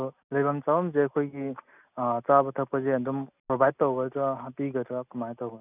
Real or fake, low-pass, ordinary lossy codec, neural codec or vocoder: real; 3.6 kHz; none; none